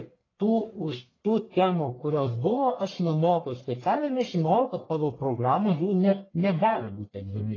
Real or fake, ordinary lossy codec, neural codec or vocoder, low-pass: fake; AAC, 32 kbps; codec, 44.1 kHz, 1.7 kbps, Pupu-Codec; 7.2 kHz